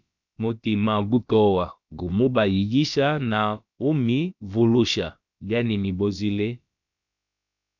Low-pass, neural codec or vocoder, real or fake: 7.2 kHz; codec, 16 kHz, about 1 kbps, DyCAST, with the encoder's durations; fake